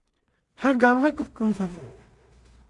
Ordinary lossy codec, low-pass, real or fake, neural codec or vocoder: Opus, 64 kbps; 10.8 kHz; fake; codec, 16 kHz in and 24 kHz out, 0.4 kbps, LongCat-Audio-Codec, two codebook decoder